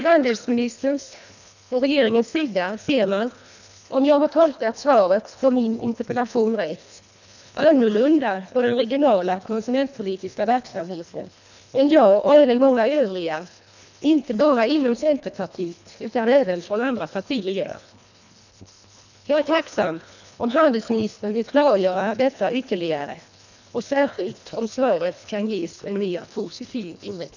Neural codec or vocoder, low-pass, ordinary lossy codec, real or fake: codec, 24 kHz, 1.5 kbps, HILCodec; 7.2 kHz; none; fake